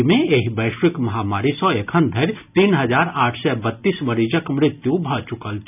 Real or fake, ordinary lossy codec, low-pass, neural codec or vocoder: real; none; 3.6 kHz; none